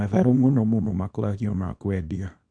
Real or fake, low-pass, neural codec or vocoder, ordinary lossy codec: fake; 9.9 kHz; codec, 24 kHz, 0.9 kbps, WavTokenizer, small release; MP3, 64 kbps